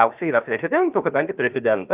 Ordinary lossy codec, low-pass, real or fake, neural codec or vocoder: Opus, 24 kbps; 3.6 kHz; fake; codec, 16 kHz, 0.7 kbps, FocalCodec